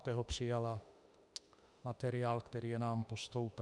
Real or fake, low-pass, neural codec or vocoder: fake; 10.8 kHz; autoencoder, 48 kHz, 32 numbers a frame, DAC-VAE, trained on Japanese speech